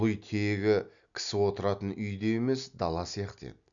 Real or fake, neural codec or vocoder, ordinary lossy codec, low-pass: real; none; none; 7.2 kHz